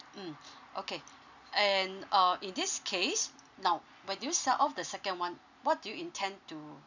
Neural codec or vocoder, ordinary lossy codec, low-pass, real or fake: none; none; 7.2 kHz; real